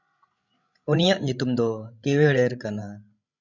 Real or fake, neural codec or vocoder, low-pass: fake; codec, 16 kHz, 16 kbps, FreqCodec, larger model; 7.2 kHz